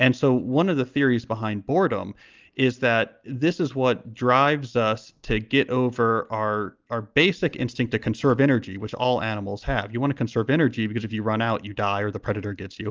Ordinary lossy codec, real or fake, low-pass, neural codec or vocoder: Opus, 24 kbps; real; 7.2 kHz; none